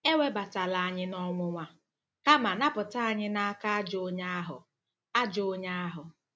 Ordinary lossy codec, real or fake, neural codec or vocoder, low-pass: none; real; none; none